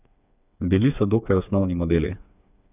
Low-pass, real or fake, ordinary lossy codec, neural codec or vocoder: 3.6 kHz; fake; none; codec, 16 kHz, 4 kbps, FreqCodec, smaller model